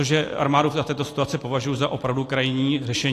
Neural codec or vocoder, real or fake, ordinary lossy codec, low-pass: none; real; AAC, 48 kbps; 14.4 kHz